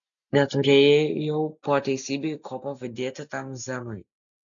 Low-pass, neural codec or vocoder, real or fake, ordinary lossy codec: 7.2 kHz; none; real; MP3, 96 kbps